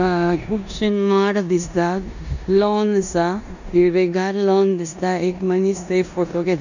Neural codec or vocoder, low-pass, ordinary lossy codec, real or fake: codec, 16 kHz in and 24 kHz out, 0.9 kbps, LongCat-Audio-Codec, four codebook decoder; 7.2 kHz; none; fake